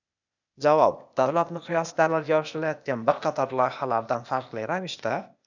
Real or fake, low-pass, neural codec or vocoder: fake; 7.2 kHz; codec, 16 kHz, 0.8 kbps, ZipCodec